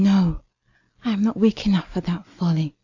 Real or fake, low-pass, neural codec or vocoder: real; 7.2 kHz; none